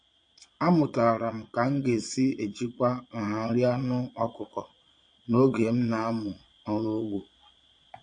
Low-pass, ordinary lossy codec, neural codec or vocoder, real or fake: 9.9 kHz; MP3, 48 kbps; vocoder, 22.05 kHz, 80 mel bands, Vocos; fake